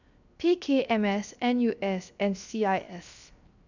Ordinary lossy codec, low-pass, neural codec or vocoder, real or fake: none; 7.2 kHz; codec, 16 kHz, 0.7 kbps, FocalCodec; fake